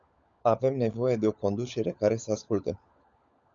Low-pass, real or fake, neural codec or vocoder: 7.2 kHz; fake; codec, 16 kHz, 16 kbps, FunCodec, trained on LibriTTS, 50 frames a second